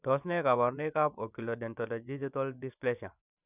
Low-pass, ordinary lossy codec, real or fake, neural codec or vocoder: 3.6 kHz; none; fake; vocoder, 24 kHz, 100 mel bands, Vocos